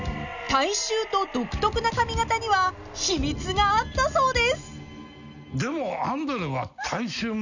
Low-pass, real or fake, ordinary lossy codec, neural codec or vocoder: 7.2 kHz; real; none; none